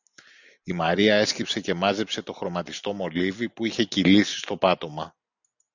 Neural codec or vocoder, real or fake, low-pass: none; real; 7.2 kHz